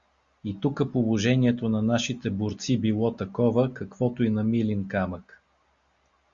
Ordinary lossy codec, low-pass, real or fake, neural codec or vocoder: Opus, 64 kbps; 7.2 kHz; real; none